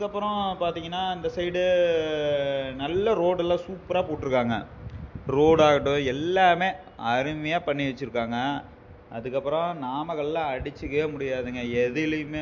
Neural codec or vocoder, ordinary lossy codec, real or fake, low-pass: none; MP3, 48 kbps; real; 7.2 kHz